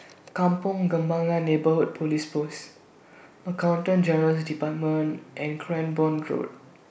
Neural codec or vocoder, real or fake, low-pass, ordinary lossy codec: none; real; none; none